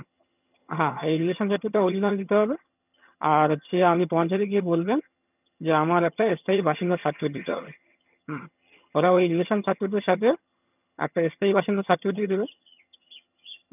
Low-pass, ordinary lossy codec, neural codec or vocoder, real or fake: 3.6 kHz; none; vocoder, 22.05 kHz, 80 mel bands, HiFi-GAN; fake